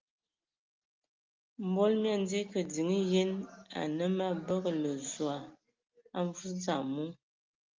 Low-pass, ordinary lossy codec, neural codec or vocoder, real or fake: 7.2 kHz; Opus, 32 kbps; none; real